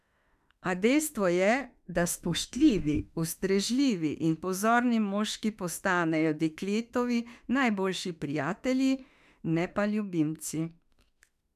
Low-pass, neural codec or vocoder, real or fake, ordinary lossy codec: 14.4 kHz; autoencoder, 48 kHz, 32 numbers a frame, DAC-VAE, trained on Japanese speech; fake; none